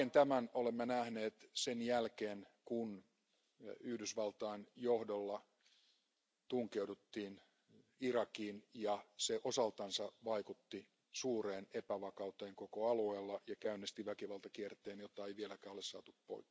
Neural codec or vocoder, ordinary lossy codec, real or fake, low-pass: none; none; real; none